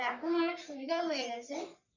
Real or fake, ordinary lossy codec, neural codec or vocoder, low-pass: fake; none; codec, 44.1 kHz, 3.4 kbps, Pupu-Codec; 7.2 kHz